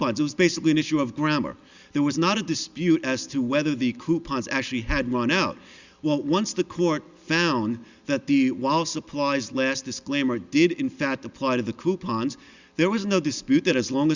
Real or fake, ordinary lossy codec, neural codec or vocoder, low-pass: real; Opus, 64 kbps; none; 7.2 kHz